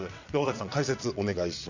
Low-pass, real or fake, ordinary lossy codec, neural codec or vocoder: 7.2 kHz; fake; none; vocoder, 22.05 kHz, 80 mel bands, WaveNeXt